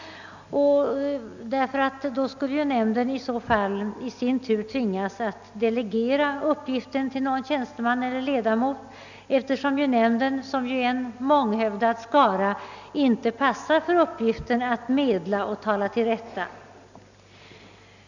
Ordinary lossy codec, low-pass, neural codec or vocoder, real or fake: none; 7.2 kHz; none; real